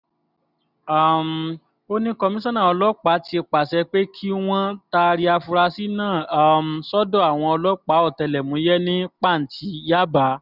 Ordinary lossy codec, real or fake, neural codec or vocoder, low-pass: none; real; none; 5.4 kHz